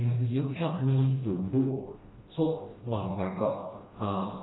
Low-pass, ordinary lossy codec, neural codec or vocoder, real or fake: 7.2 kHz; AAC, 16 kbps; codec, 16 kHz, 1 kbps, FreqCodec, smaller model; fake